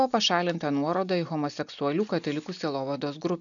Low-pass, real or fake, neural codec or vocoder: 7.2 kHz; real; none